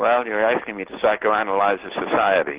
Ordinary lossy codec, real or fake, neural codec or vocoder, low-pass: Opus, 16 kbps; real; none; 3.6 kHz